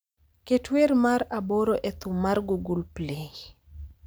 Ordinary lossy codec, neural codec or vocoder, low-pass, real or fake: none; none; none; real